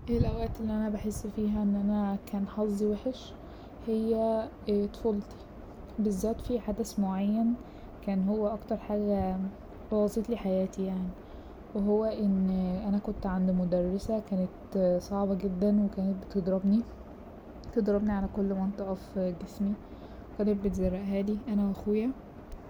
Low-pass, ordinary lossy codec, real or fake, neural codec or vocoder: 19.8 kHz; none; real; none